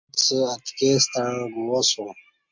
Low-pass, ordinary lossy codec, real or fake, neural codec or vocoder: 7.2 kHz; MP3, 48 kbps; real; none